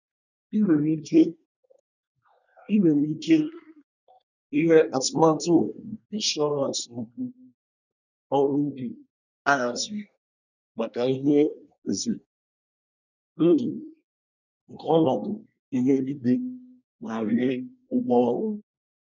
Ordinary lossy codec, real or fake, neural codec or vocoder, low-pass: none; fake; codec, 24 kHz, 1 kbps, SNAC; 7.2 kHz